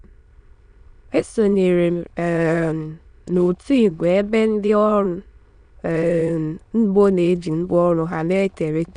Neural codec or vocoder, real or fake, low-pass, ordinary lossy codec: autoencoder, 22.05 kHz, a latent of 192 numbers a frame, VITS, trained on many speakers; fake; 9.9 kHz; none